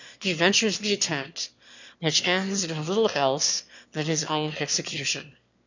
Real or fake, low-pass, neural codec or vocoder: fake; 7.2 kHz; autoencoder, 22.05 kHz, a latent of 192 numbers a frame, VITS, trained on one speaker